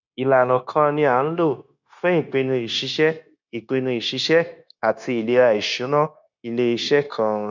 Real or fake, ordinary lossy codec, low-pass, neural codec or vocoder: fake; none; 7.2 kHz; codec, 16 kHz, 0.9 kbps, LongCat-Audio-Codec